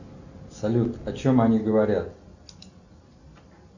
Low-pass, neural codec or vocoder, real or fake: 7.2 kHz; none; real